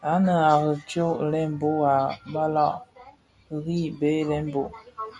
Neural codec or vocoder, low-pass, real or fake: none; 10.8 kHz; real